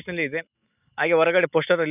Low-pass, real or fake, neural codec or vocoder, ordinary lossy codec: 3.6 kHz; real; none; none